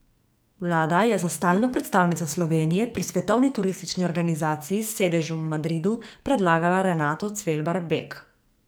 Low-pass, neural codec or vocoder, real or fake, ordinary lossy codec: none; codec, 44.1 kHz, 2.6 kbps, SNAC; fake; none